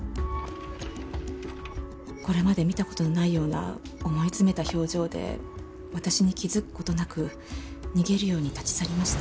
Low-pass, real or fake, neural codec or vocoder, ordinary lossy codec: none; real; none; none